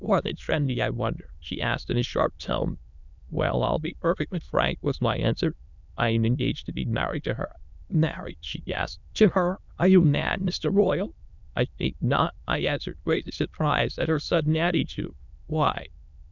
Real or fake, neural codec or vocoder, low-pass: fake; autoencoder, 22.05 kHz, a latent of 192 numbers a frame, VITS, trained on many speakers; 7.2 kHz